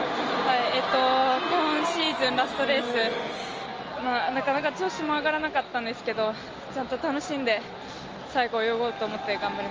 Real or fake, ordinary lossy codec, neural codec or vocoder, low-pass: real; Opus, 24 kbps; none; 7.2 kHz